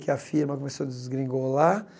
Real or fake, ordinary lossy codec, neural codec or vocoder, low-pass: real; none; none; none